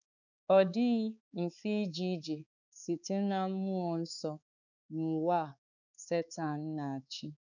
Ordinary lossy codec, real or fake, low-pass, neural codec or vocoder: none; fake; 7.2 kHz; codec, 16 kHz, 4 kbps, X-Codec, HuBERT features, trained on balanced general audio